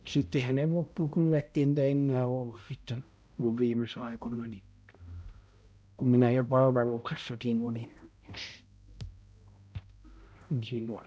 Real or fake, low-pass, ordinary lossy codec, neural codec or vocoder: fake; none; none; codec, 16 kHz, 0.5 kbps, X-Codec, HuBERT features, trained on balanced general audio